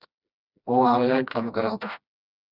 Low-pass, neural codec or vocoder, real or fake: 5.4 kHz; codec, 16 kHz, 1 kbps, FreqCodec, smaller model; fake